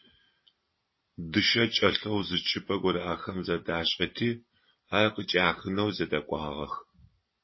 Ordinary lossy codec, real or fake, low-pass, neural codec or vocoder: MP3, 24 kbps; real; 7.2 kHz; none